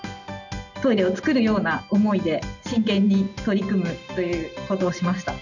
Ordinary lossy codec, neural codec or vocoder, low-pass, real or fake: none; none; 7.2 kHz; real